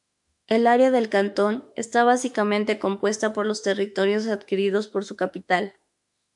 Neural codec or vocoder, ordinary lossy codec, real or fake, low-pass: autoencoder, 48 kHz, 32 numbers a frame, DAC-VAE, trained on Japanese speech; MP3, 96 kbps; fake; 10.8 kHz